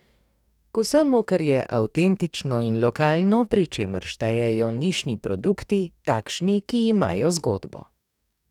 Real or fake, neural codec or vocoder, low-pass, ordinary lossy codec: fake; codec, 44.1 kHz, 2.6 kbps, DAC; 19.8 kHz; none